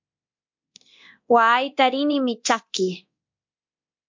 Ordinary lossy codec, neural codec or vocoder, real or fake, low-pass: MP3, 48 kbps; codec, 24 kHz, 0.9 kbps, DualCodec; fake; 7.2 kHz